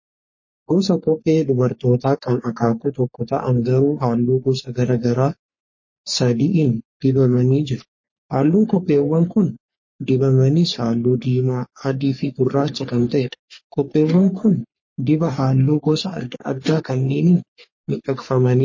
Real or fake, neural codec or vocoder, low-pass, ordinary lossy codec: fake; codec, 44.1 kHz, 3.4 kbps, Pupu-Codec; 7.2 kHz; MP3, 32 kbps